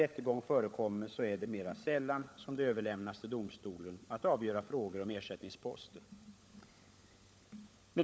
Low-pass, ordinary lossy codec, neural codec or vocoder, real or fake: none; none; codec, 16 kHz, 16 kbps, FunCodec, trained on LibriTTS, 50 frames a second; fake